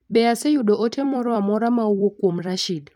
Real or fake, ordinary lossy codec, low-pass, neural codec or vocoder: fake; MP3, 96 kbps; 19.8 kHz; vocoder, 44.1 kHz, 128 mel bands every 256 samples, BigVGAN v2